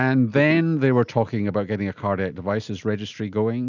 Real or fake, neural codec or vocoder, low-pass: real; none; 7.2 kHz